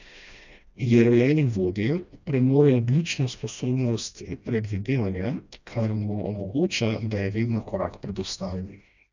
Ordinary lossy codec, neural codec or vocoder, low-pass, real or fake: none; codec, 16 kHz, 1 kbps, FreqCodec, smaller model; 7.2 kHz; fake